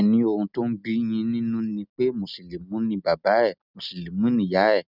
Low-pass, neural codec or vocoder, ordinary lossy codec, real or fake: 5.4 kHz; none; none; real